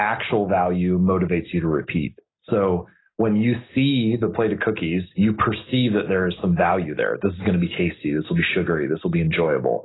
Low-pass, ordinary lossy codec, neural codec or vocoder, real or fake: 7.2 kHz; AAC, 16 kbps; none; real